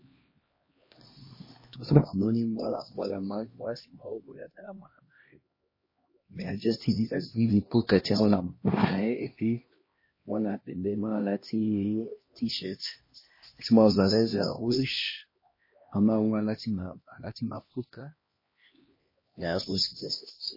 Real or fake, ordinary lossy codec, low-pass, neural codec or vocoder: fake; MP3, 24 kbps; 5.4 kHz; codec, 16 kHz, 1 kbps, X-Codec, HuBERT features, trained on LibriSpeech